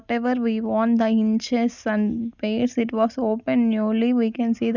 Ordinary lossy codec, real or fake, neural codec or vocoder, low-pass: none; real; none; 7.2 kHz